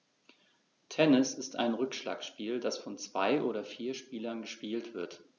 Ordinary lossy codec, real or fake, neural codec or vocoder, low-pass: none; real; none; 7.2 kHz